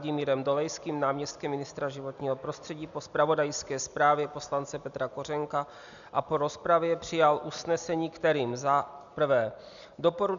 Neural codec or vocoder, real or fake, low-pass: none; real; 7.2 kHz